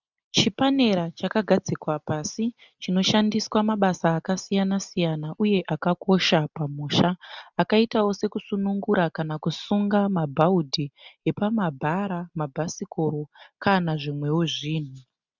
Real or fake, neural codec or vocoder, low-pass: real; none; 7.2 kHz